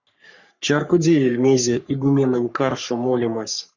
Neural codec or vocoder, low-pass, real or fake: codec, 44.1 kHz, 3.4 kbps, Pupu-Codec; 7.2 kHz; fake